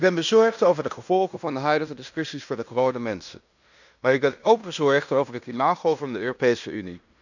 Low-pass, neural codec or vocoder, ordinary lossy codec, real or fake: 7.2 kHz; codec, 16 kHz in and 24 kHz out, 0.9 kbps, LongCat-Audio-Codec, fine tuned four codebook decoder; none; fake